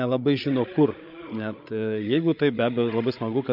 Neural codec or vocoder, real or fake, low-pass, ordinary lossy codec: codec, 16 kHz, 8 kbps, FreqCodec, larger model; fake; 5.4 kHz; MP3, 48 kbps